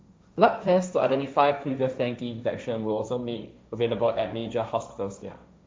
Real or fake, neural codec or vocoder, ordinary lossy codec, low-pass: fake; codec, 16 kHz, 1.1 kbps, Voila-Tokenizer; none; none